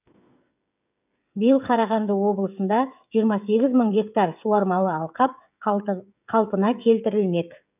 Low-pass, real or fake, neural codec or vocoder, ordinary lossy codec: 3.6 kHz; fake; codec, 16 kHz, 16 kbps, FreqCodec, smaller model; none